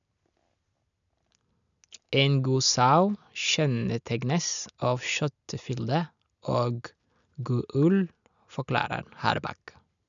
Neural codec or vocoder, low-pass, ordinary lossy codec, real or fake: none; 7.2 kHz; none; real